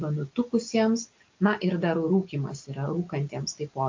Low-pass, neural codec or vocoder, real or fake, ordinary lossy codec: 7.2 kHz; none; real; MP3, 48 kbps